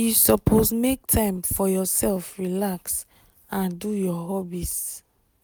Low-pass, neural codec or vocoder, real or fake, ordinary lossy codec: none; none; real; none